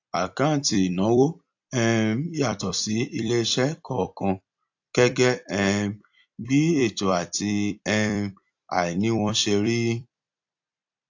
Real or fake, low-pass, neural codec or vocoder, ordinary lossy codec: fake; 7.2 kHz; vocoder, 44.1 kHz, 128 mel bands every 256 samples, BigVGAN v2; AAC, 48 kbps